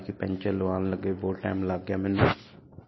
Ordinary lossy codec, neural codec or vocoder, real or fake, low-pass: MP3, 24 kbps; none; real; 7.2 kHz